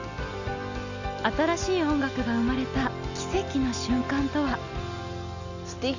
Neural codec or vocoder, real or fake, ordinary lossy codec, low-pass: none; real; none; 7.2 kHz